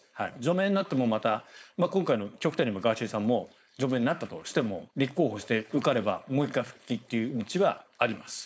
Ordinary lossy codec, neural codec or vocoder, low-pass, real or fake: none; codec, 16 kHz, 4.8 kbps, FACodec; none; fake